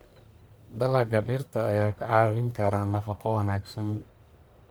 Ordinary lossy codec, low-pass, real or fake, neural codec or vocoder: none; none; fake; codec, 44.1 kHz, 1.7 kbps, Pupu-Codec